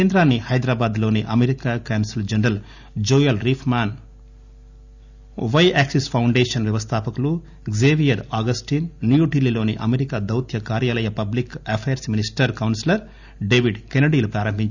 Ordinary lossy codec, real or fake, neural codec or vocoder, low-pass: none; real; none; 7.2 kHz